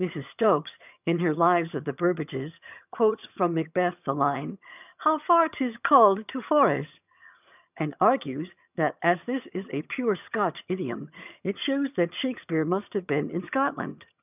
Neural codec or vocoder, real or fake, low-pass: vocoder, 22.05 kHz, 80 mel bands, HiFi-GAN; fake; 3.6 kHz